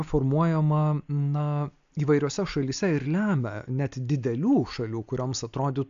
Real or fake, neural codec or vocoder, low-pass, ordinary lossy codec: real; none; 7.2 kHz; AAC, 64 kbps